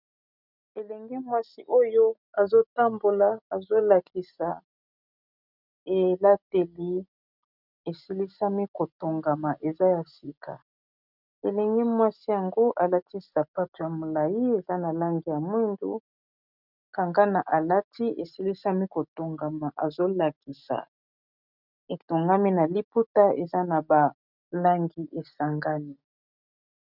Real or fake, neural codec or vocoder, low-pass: real; none; 5.4 kHz